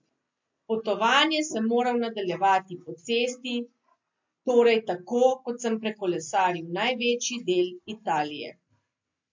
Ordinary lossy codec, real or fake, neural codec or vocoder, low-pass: MP3, 48 kbps; real; none; 7.2 kHz